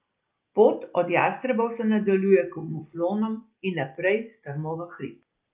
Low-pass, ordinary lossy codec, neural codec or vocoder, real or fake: 3.6 kHz; Opus, 24 kbps; none; real